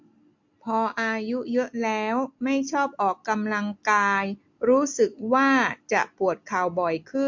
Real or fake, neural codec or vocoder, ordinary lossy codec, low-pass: real; none; MP3, 48 kbps; 7.2 kHz